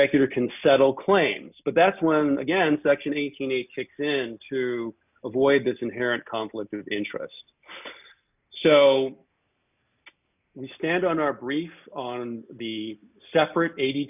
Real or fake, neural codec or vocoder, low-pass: real; none; 3.6 kHz